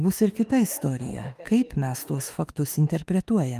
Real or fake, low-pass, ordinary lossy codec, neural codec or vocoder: fake; 14.4 kHz; Opus, 32 kbps; autoencoder, 48 kHz, 32 numbers a frame, DAC-VAE, trained on Japanese speech